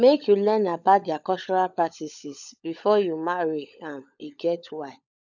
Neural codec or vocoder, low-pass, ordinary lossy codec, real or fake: codec, 16 kHz, 16 kbps, FunCodec, trained on LibriTTS, 50 frames a second; 7.2 kHz; none; fake